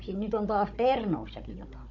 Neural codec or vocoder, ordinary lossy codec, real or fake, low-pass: codec, 16 kHz, 16 kbps, FunCodec, trained on Chinese and English, 50 frames a second; MP3, 48 kbps; fake; 7.2 kHz